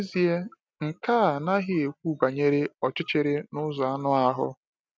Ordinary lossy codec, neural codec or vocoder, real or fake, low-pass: none; none; real; none